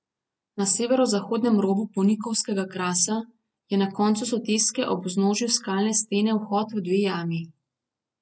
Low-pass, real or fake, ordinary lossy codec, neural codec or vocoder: none; real; none; none